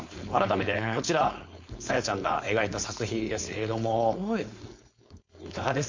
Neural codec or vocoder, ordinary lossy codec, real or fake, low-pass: codec, 16 kHz, 4.8 kbps, FACodec; MP3, 48 kbps; fake; 7.2 kHz